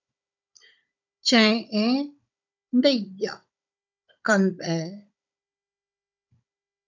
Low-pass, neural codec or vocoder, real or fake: 7.2 kHz; codec, 16 kHz, 16 kbps, FunCodec, trained on Chinese and English, 50 frames a second; fake